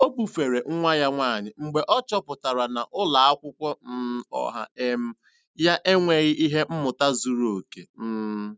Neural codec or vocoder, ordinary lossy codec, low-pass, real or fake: none; none; none; real